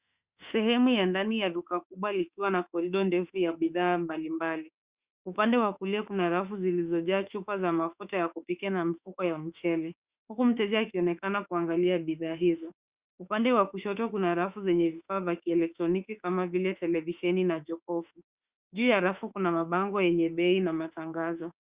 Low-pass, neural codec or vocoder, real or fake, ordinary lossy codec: 3.6 kHz; autoencoder, 48 kHz, 32 numbers a frame, DAC-VAE, trained on Japanese speech; fake; Opus, 64 kbps